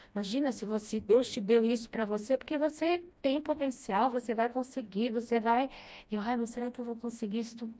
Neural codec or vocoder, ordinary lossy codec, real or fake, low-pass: codec, 16 kHz, 1 kbps, FreqCodec, smaller model; none; fake; none